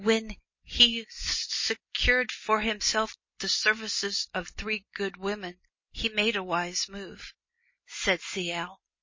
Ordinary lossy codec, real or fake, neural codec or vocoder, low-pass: MP3, 32 kbps; real; none; 7.2 kHz